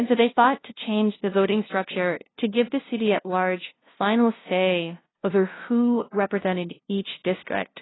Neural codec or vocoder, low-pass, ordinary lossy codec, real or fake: codec, 16 kHz, 0.5 kbps, FunCodec, trained on LibriTTS, 25 frames a second; 7.2 kHz; AAC, 16 kbps; fake